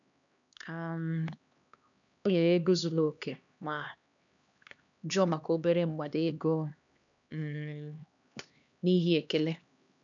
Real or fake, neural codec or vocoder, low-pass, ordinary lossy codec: fake; codec, 16 kHz, 2 kbps, X-Codec, HuBERT features, trained on LibriSpeech; 7.2 kHz; none